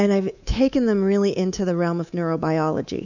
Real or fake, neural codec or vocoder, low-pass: fake; codec, 24 kHz, 3.1 kbps, DualCodec; 7.2 kHz